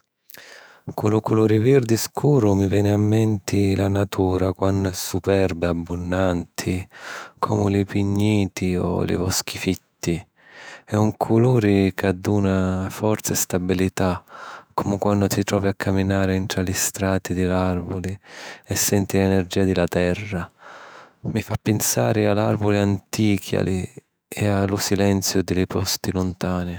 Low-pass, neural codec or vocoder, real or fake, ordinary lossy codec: none; autoencoder, 48 kHz, 128 numbers a frame, DAC-VAE, trained on Japanese speech; fake; none